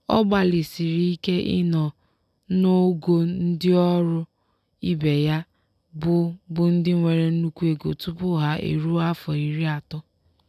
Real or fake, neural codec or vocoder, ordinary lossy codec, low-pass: real; none; none; 14.4 kHz